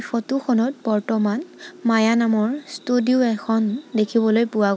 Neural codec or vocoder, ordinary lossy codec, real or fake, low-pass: none; none; real; none